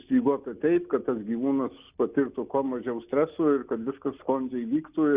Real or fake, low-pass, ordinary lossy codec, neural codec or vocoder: real; 3.6 kHz; Opus, 64 kbps; none